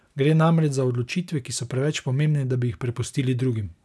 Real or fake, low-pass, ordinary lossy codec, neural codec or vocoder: real; none; none; none